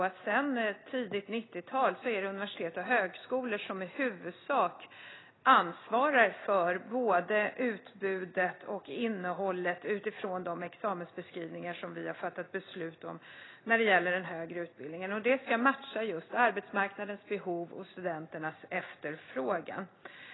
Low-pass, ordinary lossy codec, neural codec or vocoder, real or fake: 7.2 kHz; AAC, 16 kbps; none; real